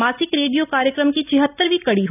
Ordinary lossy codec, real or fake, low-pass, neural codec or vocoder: none; real; 3.6 kHz; none